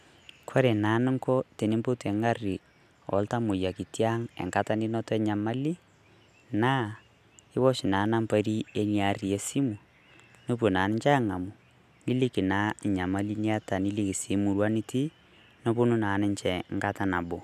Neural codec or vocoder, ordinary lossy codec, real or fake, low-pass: none; none; real; 14.4 kHz